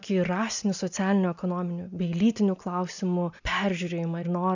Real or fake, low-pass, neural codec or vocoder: real; 7.2 kHz; none